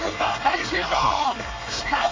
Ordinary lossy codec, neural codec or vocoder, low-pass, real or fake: MP3, 32 kbps; codec, 24 kHz, 1 kbps, SNAC; 7.2 kHz; fake